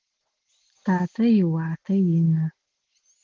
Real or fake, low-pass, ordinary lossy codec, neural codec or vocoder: real; 7.2 kHz; Opus, 16 kbps; none